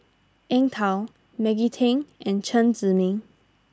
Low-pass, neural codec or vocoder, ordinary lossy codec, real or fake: none; none; none; real